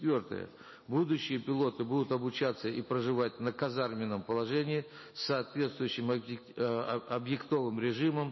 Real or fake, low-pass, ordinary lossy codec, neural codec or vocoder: real; 7.2 kHz; MP3, 24 kbps; none